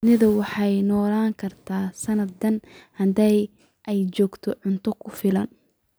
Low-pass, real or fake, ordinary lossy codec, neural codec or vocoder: none; real; none; none